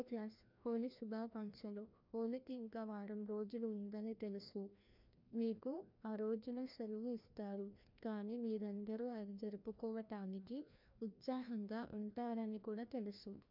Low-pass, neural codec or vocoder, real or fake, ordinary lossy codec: 5.4 kHz; codec, 16 kHz, 1 kbps, FreqCodec, larger model; fake; MP3, 48 kbps